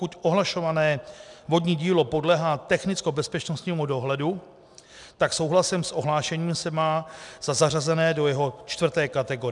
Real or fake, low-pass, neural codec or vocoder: real; 10.8 kHz; none